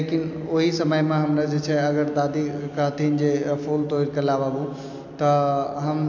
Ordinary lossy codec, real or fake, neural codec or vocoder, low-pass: AAC, 48 kbps; real; none; 7.2 kHz